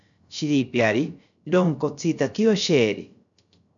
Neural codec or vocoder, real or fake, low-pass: codec, 16 kHz, 0.3 kbps, FocalCodec; fake; 7.2 kHz